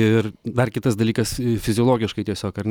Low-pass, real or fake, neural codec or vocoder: 19.8 kHz; real; none